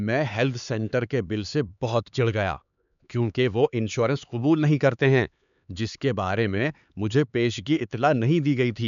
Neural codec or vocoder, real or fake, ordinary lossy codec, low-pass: codec, 16 kHz, 4 kbps, X-Codec, HuBERT features, trained on LibriSpeech; fake; none; 7.2 kHz